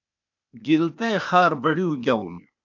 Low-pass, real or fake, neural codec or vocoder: 7.2 kHz; fake; codec, 16 kHz, 0.8 kbps, ZipCodec